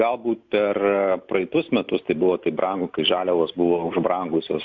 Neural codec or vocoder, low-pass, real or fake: none; 7.2 kHz; real